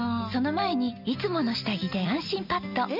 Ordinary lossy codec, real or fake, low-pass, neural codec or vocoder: none; real; 5.4 kHz; none